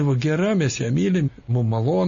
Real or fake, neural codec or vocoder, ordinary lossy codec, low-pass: real; none; MP3, 32 kbps; 7.2 kHz